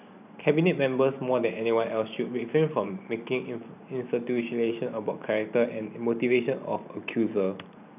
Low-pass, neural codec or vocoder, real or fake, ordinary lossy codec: 3.6 kHz; vocoder, 44.1 kHz, 128 mel bands every 512 samples, BigVGAN v2; fake; none